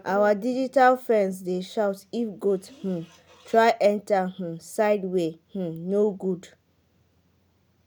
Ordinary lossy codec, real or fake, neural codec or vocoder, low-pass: none; real; none; none